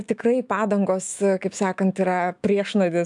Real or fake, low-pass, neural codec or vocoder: fake; 10.8 kHz; autoencoder, 48 kHz, 128 numbers a frame, DAC-VAE, trained on Japanese speech